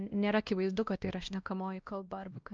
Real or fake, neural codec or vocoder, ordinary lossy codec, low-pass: fake; codec, 16 kHz, 1 kbps, X-Codec, WavLM features, trained on Multilingual LibriSpeech; Opus, 24 kbps; 7.2 kHz